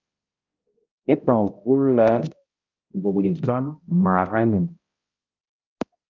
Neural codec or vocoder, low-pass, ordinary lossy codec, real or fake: codec, 16 kHz, 0.5 kbps, X-Codec, HuBERT features, trained on balanced general audio; 7.2 kHz; Opus, 32 kbps; fake